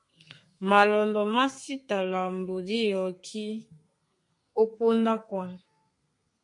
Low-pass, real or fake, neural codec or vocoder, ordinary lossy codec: 10.8 kHz; fake; codec, 32 kHz, 1.9 kbps, SNAC; MP3, 48 kbps